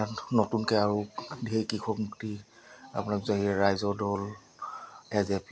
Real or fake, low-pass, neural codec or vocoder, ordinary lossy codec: real; none; none; none